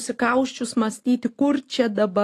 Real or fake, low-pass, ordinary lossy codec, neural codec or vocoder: real; 14.4 kHz; AAC, 48 kbps; none